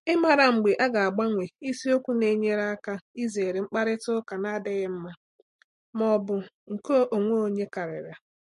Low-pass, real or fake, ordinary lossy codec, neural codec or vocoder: 14.4 kHz; real; MP3, 48 kbps; none